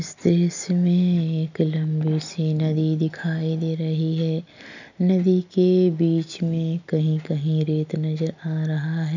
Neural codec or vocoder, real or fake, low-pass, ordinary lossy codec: none; real; 7.2 kHz; none